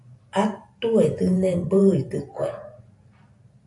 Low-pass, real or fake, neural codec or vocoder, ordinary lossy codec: 10.8 kHz; fake; vocoder, 44.1 kHz, 128 mel bands every 512 samples, BigVGAN v2; AAC, 64 kbps